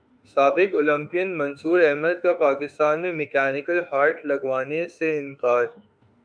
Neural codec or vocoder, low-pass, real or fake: autoencoder, 48 kHz, 32 numbers a frame, DAC-VAE, trained on Japanese speech; 9.9 kHz; fake